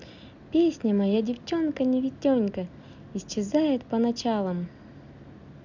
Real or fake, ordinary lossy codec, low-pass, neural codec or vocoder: real; none; 7.2 kHz; none